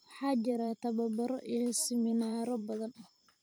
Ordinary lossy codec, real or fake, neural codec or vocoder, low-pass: none; fake; vocoder, 44.1 kHz, 128 mel bands every 512 samples, BigVGAN v2; none